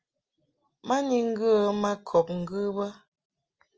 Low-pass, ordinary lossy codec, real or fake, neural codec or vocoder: 7.2 kHz; Opus, 24 kbps; real; none